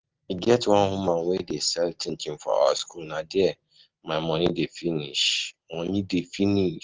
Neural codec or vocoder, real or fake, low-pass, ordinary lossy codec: none; real; 7.2 kHz; Opus, 16 kbps